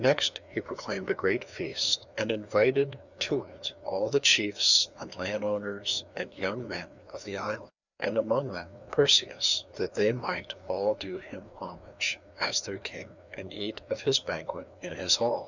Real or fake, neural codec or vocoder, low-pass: fake; codec, 16 kHz, 2 kbps, FreqCodec, larger model; 7.2 kHz